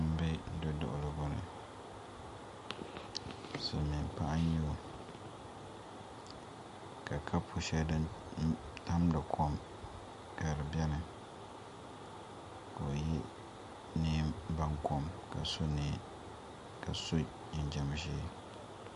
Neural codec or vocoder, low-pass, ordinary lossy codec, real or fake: none; 14.4 kHz; MP3, 48 kbps; real